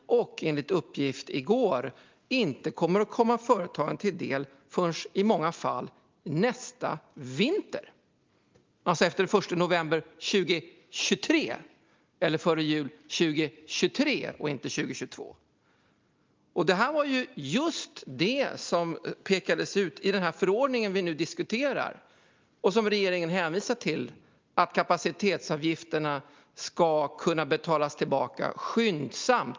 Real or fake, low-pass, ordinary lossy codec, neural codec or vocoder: real; 7.2 kHz; Opus, 24 kbps; none